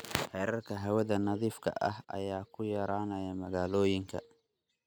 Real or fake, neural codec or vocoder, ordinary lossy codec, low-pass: real; none; none; none